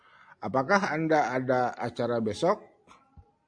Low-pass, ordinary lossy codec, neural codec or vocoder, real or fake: 9.9 kHz; AAC, 64 kbps; none; real